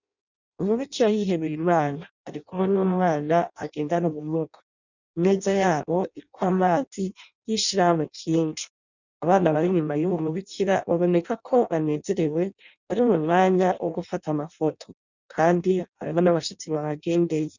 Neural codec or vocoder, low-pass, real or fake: codec, 16 kHz in and 24 kHz out, 0.6 kbps, FireRedTTS-2 codec; 7.2 kHz; fake